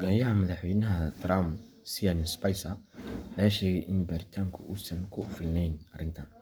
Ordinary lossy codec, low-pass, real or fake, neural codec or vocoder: none; none; fake; codec, 44.1 kHz, 7.8 kbps, Pupu-Codec